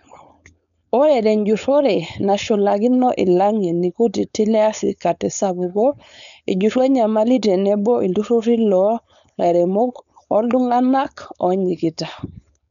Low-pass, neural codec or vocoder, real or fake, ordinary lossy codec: 7.2 kHz; codec, 16 kHz, 4.8 kbps, FACodec; fake; none